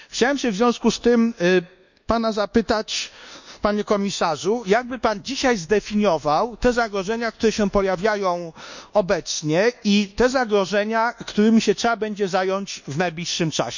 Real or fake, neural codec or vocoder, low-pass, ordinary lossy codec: fake; codec, 24 kHz, 1.2 kbps, DualCodec; 7.2 kHz; none